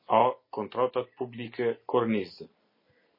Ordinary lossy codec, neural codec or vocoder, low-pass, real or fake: MP3, 24 kbps; none; 5.4 kHz; real